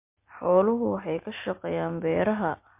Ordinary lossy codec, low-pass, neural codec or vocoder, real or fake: MP3, 24 kbps; 3.6 kHz; none; real